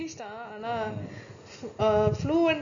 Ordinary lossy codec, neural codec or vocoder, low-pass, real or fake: none; none; 7.2 kHz; real